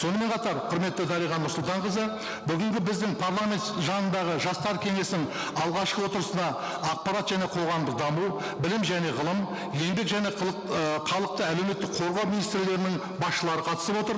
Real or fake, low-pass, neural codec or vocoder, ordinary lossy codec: real; none; none; none